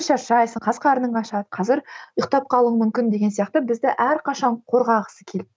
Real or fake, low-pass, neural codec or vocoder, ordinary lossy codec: real; none; none; none